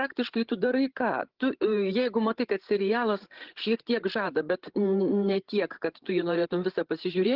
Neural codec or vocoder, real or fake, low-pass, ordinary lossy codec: codec, 16 kHz, 16 kbps, FreqCodec, larger model; fake; 5.4 kHz; Opus, 16 kbps